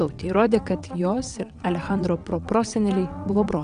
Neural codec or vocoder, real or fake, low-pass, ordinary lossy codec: vocoder, 22.05 kHz, 80 mel bands, WaveNeXt; fake; 9.9 kHz; AAC, 96 kbps